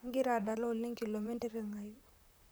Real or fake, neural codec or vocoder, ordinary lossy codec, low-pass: fake; vocoder, 44.1 kHz, 128 mel bands, Pupu-Vocoder; none; none